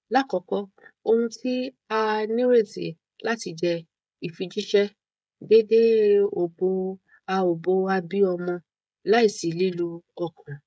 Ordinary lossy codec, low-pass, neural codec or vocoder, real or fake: none; none; codec, 16 kHz, 16 kbps, FreqCodec, smaller model; fake